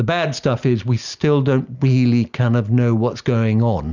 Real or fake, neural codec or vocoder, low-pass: real; none; 7.2 kHz